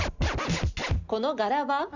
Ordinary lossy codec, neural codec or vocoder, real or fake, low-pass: none; none; real; 7.2 kHz